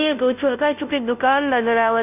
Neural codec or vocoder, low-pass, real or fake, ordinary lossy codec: codec, 16 kHz, 0.5 kbps, FunCodec, trained on Chinese and English, 25 frames a second; 3.6 kHz; fake; none